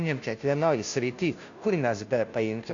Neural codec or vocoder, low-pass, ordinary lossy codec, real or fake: codec, 16 kHz, 0.5 kbps, FunCodec, trained on Chinese and English, 25 frames a second; 7.2 kHz; AAC, 48 kbps; fake